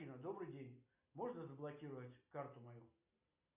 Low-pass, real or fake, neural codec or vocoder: 3.6 kHz; real; none